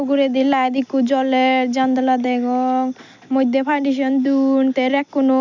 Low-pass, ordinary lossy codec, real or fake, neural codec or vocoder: 7.2 kHz; none; real; none